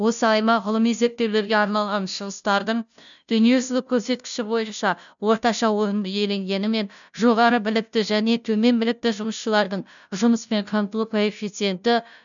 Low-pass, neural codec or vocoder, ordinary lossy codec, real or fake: 7.2 kHz; codec, 16 kHz, 0.5 kbps, FunCodec, trained on Chinese and English, 25 frames a second; none; fake